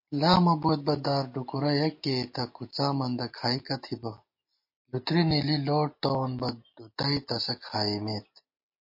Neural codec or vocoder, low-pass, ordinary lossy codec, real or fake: none; 5.4 kHz; MP3, 32 kbps; real